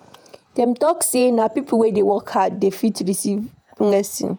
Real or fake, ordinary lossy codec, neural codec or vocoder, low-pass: fake; none; vocoder, 48 kHz, 128 mel bands, Vocos; none